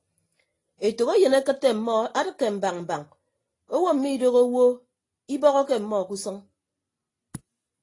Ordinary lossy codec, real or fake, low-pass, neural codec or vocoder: AAC, 32 kbps; real; 10.8 kHz; none